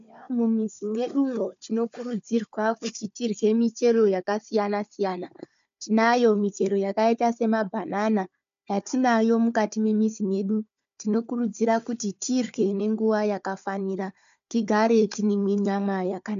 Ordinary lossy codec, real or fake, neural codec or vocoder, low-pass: MP3, 64 kbps; fake; codec, 16 kHz, 4 kbps, FunCodec, trained on Chinese and English, 50 frames a second; 7.2 kHz